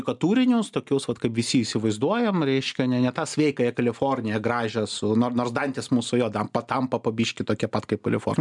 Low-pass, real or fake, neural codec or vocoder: 10.8 kHz; real; none